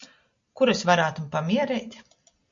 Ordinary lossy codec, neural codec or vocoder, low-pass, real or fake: MP3, 48 kbps; none; 7.2 kHz; real